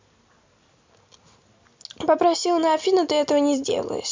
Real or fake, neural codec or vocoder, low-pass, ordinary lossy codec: real; none; 7.2 kHz; MP3, 64 kbps